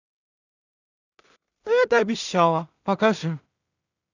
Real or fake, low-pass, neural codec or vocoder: fake; 7.2 kHz; codec, 16 kHz in and 24 kHz out, 0.4 kbps, LongCat-Audio-Codec, two codebook decoder